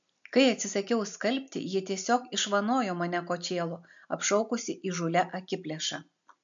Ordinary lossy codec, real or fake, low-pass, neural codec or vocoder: MP3, 64 kbps; real; 7.2 kHz; none